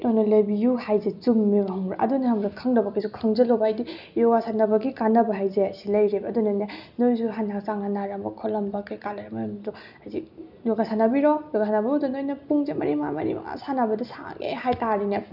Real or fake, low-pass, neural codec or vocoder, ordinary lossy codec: real; 5.4 kHz; none; none